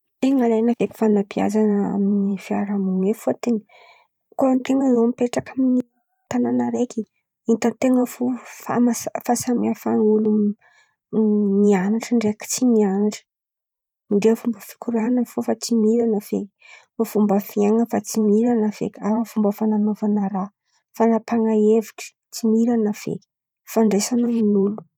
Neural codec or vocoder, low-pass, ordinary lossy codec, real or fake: vocoder, 44.1 kHz, 128 mel bands every 512 samples, BigVGAN v2; 19.8 kHz; none; fake